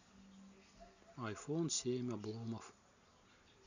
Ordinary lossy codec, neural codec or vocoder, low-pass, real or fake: none; none; 7.2 kHz; real